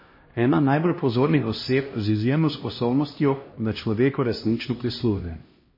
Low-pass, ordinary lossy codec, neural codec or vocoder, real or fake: 5.4 kHz; MP3, 24 kbps; codec, 16 kHz, 1 kbps, X-Codec, HuBERT features, trained on LibriSpeech; fake